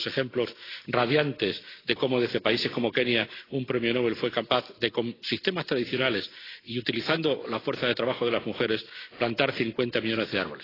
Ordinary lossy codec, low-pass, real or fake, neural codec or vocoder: AAC, 24 kbps; 5.4 kHz; real; none